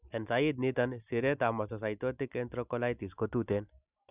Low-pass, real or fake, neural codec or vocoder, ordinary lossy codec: 3.6 kHz; real; none; none